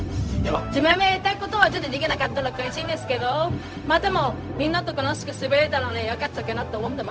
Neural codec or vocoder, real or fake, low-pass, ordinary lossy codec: codec, 16 kHz, 0.4 kbps, LongCat-Audio-Codec; fake; none; none